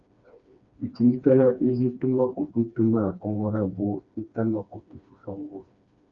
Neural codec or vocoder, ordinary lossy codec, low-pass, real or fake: codec, 16 kHz, 2 kbps, FreqCodec, smaller model; Opus, 64 kbps; 7.2 kHz; fake